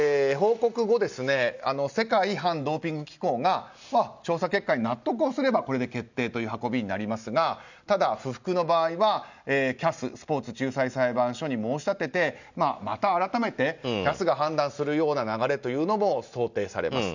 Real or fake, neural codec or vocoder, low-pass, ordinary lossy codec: real; none; 7.2 kHz; none